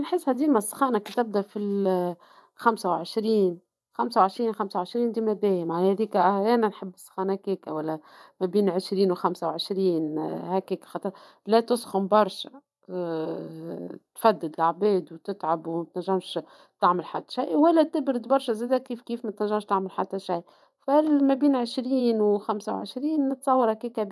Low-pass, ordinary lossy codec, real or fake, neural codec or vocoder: none; none; fake; vocoder, 24 kHz, 100 mel bands, Vocos